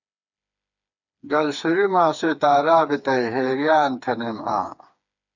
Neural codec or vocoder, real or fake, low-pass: codec, 16 kHz, 4 kbps, FreqCodec, smaller model; fake; 7.2 kHz